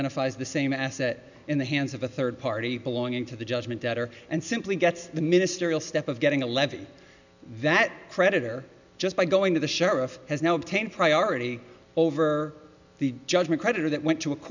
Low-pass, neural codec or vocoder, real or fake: 7.2 kHz; none; real